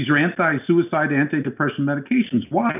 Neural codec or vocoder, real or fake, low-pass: none; real; 3.6 kHz